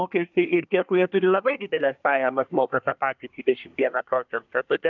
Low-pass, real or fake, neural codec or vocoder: 7.2 kHz; fake; codec, 24 kHz, 1 kbps, SNAC